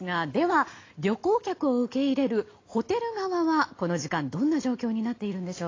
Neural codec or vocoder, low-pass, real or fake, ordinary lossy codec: none; 7.2 kHz; real; AAC, 32 kbps